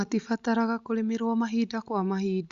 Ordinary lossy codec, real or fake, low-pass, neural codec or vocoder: Opus, 64 kbps; real; 7.2 kHz; none